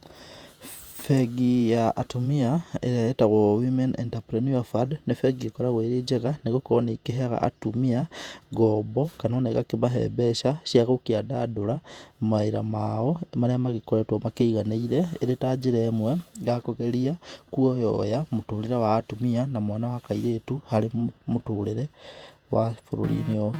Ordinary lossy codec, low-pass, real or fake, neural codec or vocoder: Opus, 64 kbps; 19.8 kHz; fake; vocoder, 48 kHz, 128 mel bands, Vocos